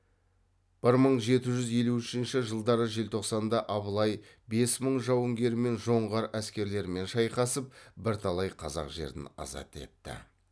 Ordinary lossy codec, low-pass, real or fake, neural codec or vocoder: none; none; real; none